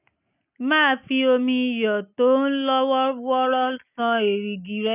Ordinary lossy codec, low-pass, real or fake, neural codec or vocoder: none; 3.6 kHz; real; none